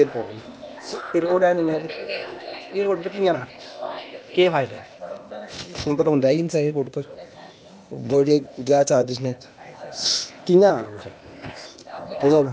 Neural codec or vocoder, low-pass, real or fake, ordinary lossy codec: codec, 16 kHz, 0.8 kbps, ZipCodec; none; fake; none